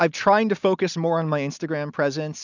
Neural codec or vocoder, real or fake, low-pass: none; real; 7.2 kHz